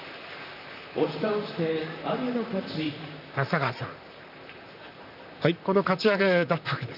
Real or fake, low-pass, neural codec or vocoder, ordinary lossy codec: fake; 5.4 kHz; vocoder, 44.1 kHz, 128 mel bands, Pupu-Vocoder; none